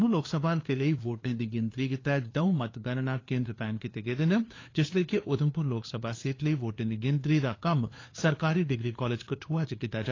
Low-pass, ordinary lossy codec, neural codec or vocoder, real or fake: 7.2 kHz; AAC, 32 kbps; codec, 16 kHz, 2 kbps, FunCodec, trained on LibriTTS, 25 frames a second; fake